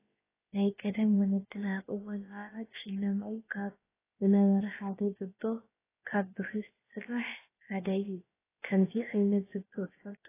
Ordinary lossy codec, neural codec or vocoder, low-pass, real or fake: AAC, 16 kbps; codec, 16 kHz, about 1 kbps, DyCAST, with the encoder's durations; 3.6 kHz; fake